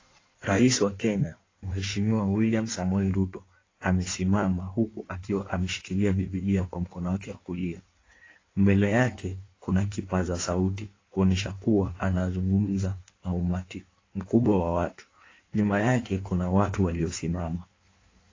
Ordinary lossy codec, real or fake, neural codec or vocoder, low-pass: AAC, 32 kbps; fake; codec, 16 kHz in and 24 kHz out, 1.1 kbps, FireRedTTS-2 codec; 7.2 kHz